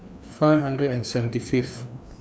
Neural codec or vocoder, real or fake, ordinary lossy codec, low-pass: codec, 16 kHz, 2 kbps, FreqCodec, larger model; fake; none; none